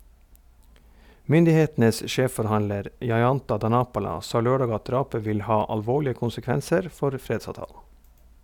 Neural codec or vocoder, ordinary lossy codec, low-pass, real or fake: none; none; 19.8 kHz; real